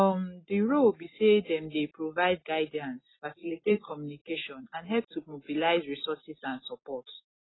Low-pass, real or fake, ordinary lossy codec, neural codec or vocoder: 7.2 kHz; real; AAC, 16 kbps; none